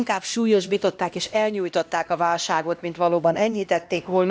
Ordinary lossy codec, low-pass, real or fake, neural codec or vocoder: none; none; fake; codec, 16 kHz, 1 kbps, X-Codec, HuBERT features, trained on LibriSpeech